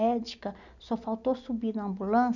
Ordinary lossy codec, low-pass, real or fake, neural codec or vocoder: none; 7.2 kHz; real; none